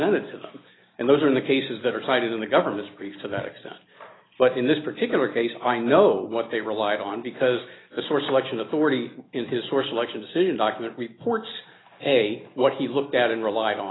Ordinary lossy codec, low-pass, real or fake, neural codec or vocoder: AAC, 16 kbps; 7.2 kHz; real; none